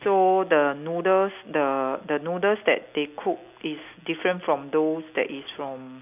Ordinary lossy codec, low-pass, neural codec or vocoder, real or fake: none; 3.6 kHz; none; real